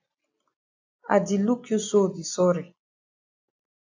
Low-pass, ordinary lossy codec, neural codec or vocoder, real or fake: 7.2 kHz; AAC, 48 kbps; none; real